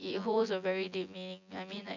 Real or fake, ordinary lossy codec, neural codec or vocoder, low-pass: fake; none; vocoder, 24 kHz, 100 mel bands, Vocos; 7.2 kHz